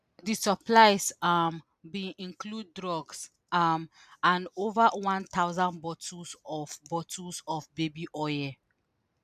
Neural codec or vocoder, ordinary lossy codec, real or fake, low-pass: none; none; real; 14.4 kHz